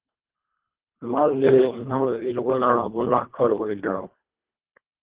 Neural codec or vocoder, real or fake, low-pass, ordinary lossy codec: codec, 24 kHz, 1.5 kbps, HILCodec; fake; 3.6 kHz; Opus, 16 kbps